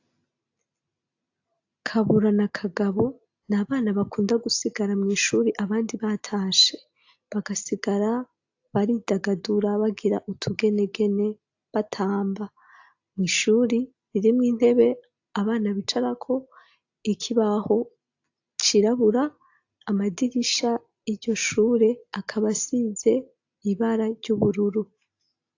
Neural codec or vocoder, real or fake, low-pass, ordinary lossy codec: none; real; 7.2 kHz; AAC, 48 kbps